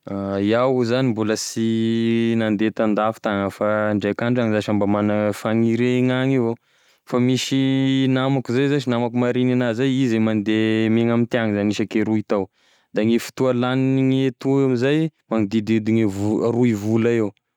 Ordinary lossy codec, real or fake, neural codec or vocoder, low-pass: none; real; none; 19.8 kHz